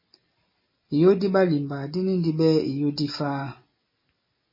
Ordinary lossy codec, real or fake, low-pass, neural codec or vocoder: MP3, 24 kbps; real; 5.4 kHz; none